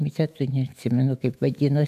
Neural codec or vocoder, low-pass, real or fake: autoencoder, 48 kHz, 128 numbers a frame, DAC-VAE, trained on Japanese speech; 14.4 kHz; fake